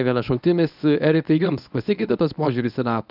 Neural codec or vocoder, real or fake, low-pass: codec, 24 kHz, 0.9 kbps, WavTokenizer, medium speech release version 1; fake; 5.4 kHz